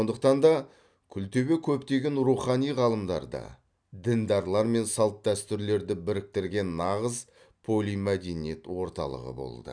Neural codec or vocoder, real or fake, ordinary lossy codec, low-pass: none; real; none; 9.9 kHz